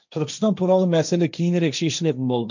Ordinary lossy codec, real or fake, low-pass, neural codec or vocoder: none; fake; 7.2 kHz; codec, 16 kHz, 1.1 kbps, Voila-Tokenizer